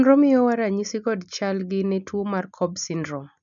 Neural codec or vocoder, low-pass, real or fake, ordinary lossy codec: none; 7.2 kHz; real; none